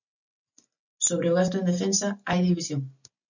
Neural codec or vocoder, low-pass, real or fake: none; 7.2 kHz; real